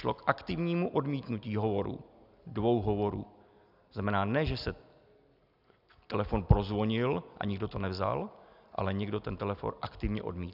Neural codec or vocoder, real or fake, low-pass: none; real; 5.4 kHz